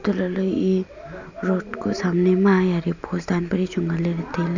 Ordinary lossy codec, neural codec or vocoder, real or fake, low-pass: none; none; real; 7.2 kHz